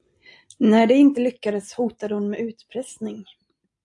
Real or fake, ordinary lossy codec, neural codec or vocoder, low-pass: real; AAC, 64 kbps; none; 10.8 kHz